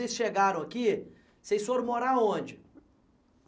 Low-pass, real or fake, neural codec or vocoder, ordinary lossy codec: none; real; none; none